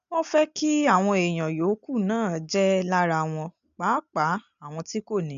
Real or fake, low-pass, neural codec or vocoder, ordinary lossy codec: real; 7.2 kHz; none; none